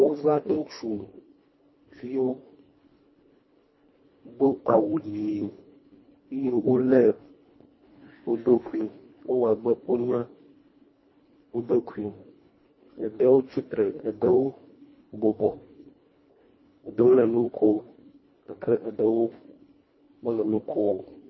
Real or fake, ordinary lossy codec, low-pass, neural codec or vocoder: fake; MP3, 24 kbps; 7.2 kHz; codec, 24 kHz, 1.5 kbps, HILCodec